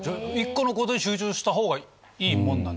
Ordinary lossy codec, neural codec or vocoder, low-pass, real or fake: none; none; none; real